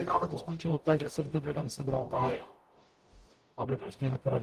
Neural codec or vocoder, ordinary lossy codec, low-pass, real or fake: codec, 44.1 kHz, 0.9 kbps, DAC; Opus, 24 kbps; 14.4 kHz; fake